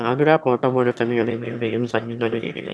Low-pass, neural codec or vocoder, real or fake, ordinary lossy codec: none; autoencoder, 22.05 kHz, a latent of 192 numbers a frame, VITS, trained on one speaker; fake; none